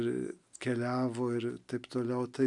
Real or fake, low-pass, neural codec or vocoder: real; 10.8 kHz; none